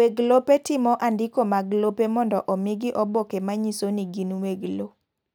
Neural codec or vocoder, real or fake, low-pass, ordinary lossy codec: none; real; none; none